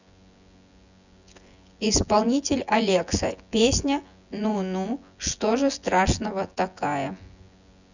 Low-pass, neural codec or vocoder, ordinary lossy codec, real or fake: 7.2 kHz; vocoder, 24 kHz, 100 mel bands, Vocos; none; fake